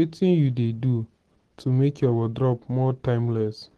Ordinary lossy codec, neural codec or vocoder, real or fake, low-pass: Opus, 16 kbps; none; real; 10.8 kHz